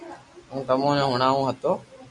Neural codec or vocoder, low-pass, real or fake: none; 10.8 kHz; real